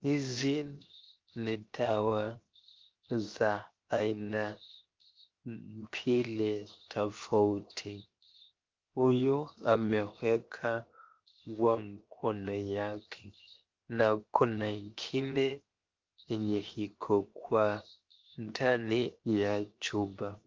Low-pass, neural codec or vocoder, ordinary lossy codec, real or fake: 7.2 kHz; codec, 16 kHz, 0.7 kbps, FocalCodec; Opus, 32 kbps; fake